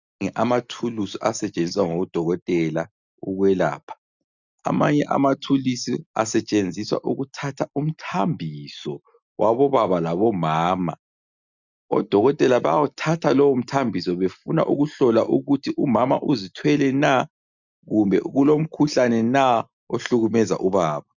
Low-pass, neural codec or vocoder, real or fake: 7.2 kHz; none; real